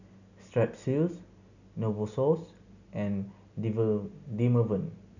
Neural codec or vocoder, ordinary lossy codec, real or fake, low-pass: none; none; real; 7.2 kHz